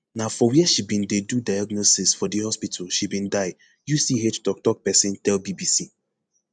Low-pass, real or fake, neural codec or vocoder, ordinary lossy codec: 9.9 kHz; real; none; none